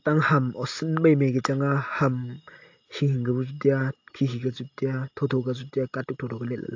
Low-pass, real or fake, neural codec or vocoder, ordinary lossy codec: 7.2 kHz; real; none; AAC, 48 kbps